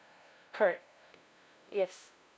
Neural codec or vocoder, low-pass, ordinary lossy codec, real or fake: codec, 16 kHz, 0.5 kbps, FunCodec, trained on LibriTTS, 25 frames a second; none; none; fake